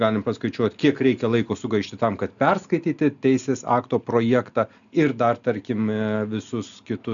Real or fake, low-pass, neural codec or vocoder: real; 7.2 kHz; none